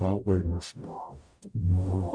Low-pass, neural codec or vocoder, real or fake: 9.9 kHz; codec, 44.1 kHz, 0.9 kbps, DAC; fake